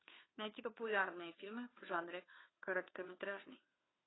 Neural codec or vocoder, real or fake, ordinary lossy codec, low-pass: codec, 16 kHz, 2 kbps, FunCodec, trained on Chinese and English, 25 frames a second; fake; AAC, 16 kbps; 7.2 kHz